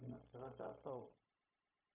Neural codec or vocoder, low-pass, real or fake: codec, 16 kHz, 0.4 kbps, LongCat-Audio-Codec; 3.6 kHz; fake